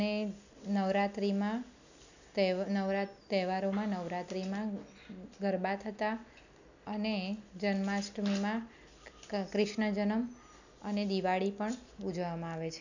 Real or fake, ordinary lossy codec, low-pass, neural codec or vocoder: real; none; 7.2 kHz; none